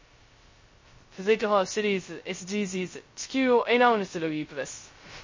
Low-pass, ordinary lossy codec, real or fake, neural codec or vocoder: 7.2 kHz; MP3, 32 kbps; fake; codec, 16 kHz, 0.2 kbps, FocalCodec